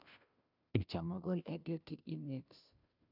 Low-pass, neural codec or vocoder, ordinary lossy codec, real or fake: 5.4 kHz; codec, 16 kHz, 0.5 kbps, FunCodec, trained on Chinese and English, 25 frames a second; none; fake